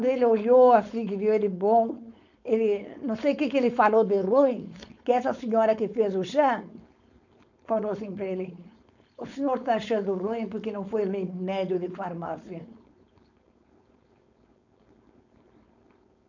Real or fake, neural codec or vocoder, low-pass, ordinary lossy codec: fake; codec, 16 kHz, 4.8 kbps, FACodec; 7.2 kHz; none